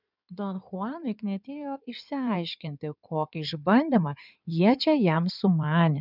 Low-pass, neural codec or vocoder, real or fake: 5.4 kHz; codec, 16 kHz in and 24 kHz out, 2.2 kbps, FireRedTTS-2 codec; fake